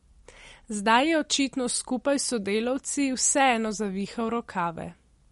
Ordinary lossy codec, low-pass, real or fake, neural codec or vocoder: MP3, 48 kbps; 19.8 kHz; real; none